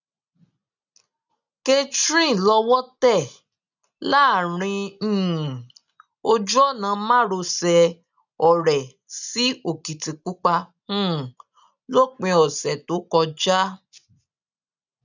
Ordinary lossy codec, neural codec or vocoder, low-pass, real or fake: none; none; 7.2 kHz; real